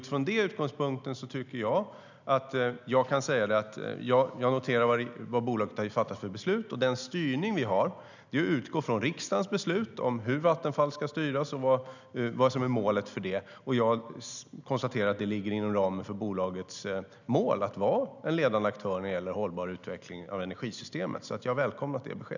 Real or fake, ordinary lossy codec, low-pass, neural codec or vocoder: real; none; 7.2 kHz; none